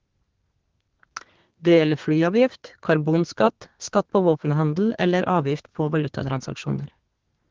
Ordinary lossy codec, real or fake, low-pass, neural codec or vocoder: Opus, 16 kbps; fake; 7.2 kHz; codec, 32 kHz, 1.9 kbps, SNAC